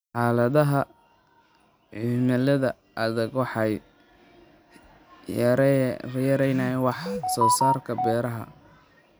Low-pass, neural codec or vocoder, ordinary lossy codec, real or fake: none; none; none; real